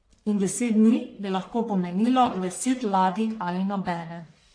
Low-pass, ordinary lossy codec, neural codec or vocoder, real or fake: 9.9 kHz; AAC, 48 kbps; codec, 44.1 kHz, 1.7 kbps, Pupu-Codec; fake